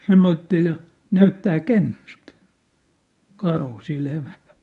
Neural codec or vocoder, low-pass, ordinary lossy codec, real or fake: codec, 24 kHz, 0.9 kbps, WavTokenizer, medium speech release version 1; 10.8 kHz; none; fake